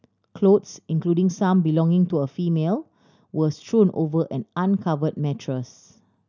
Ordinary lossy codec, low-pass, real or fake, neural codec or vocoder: none; 7.2 kHz; real; none